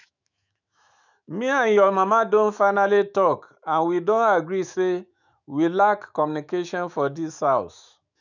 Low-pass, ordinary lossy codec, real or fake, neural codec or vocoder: 7.2 kHz; none; fake; autoencoder, 48 kHz, 128 numbers a frame, DAC-VAE, trained on Japanese speech